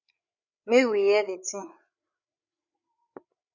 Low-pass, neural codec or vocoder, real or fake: 7.2 kHz; codec, 16 kHz, 8 kbps, FreqCodec, larger model; fake